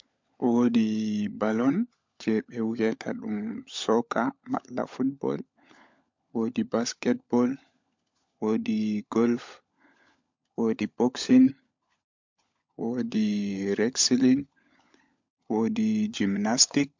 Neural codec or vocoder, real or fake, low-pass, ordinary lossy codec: codec, 16 kHz, 16 kbps, FunCodec, trained on LibriTTS, 50 frames a second; fake; 7.2 kHz; MP3, 64 kbps